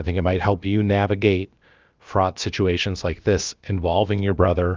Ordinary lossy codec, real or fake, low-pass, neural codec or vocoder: Opus, 32 kbps; fake; 7.2 kHz; codec, 16 kHz, about 1 kbps, DyCAST, with the encoder's durations